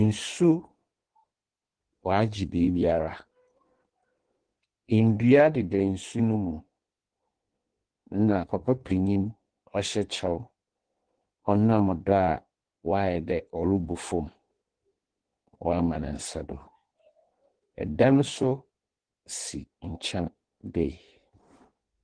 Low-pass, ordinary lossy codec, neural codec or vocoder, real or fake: 9.9 kHz; Opus, 16 kbps; codec, 16 kHz in and 24 kHz out, 1.1 kbps, FireRedTTS-2 codec; fake